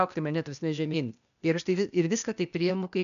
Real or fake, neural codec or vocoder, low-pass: fake; codec, 16 kHz, 0.8 kbps, ZipCodec; 7.2 kHz